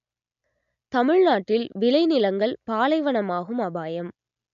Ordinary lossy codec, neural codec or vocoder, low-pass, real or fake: none; none; 7.2 kHz; real